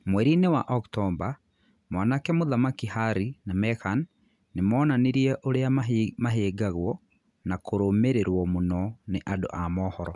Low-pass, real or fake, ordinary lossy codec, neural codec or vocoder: 10.8 kHz; real; none; none